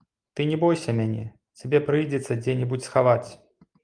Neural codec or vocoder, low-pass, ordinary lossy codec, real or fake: none; 9.9 kHz; Opus, 32 kbps; real